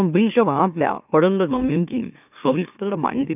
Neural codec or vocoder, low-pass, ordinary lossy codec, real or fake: autoencoder, 44.1 kHz, a latent of 192 numbers a frame, MeloTTS; 3.6 kHz; none; fake